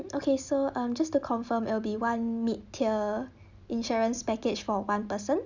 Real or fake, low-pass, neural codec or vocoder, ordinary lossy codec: real; 7.2 kHz; none; none